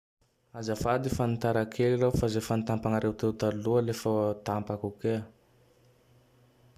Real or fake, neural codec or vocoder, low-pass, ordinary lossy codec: real; none; 14.4 kHz; AAC, 96 kbps